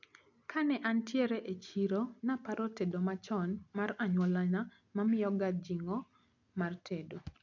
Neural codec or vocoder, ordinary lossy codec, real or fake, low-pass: none; none; real; 7.2 kHz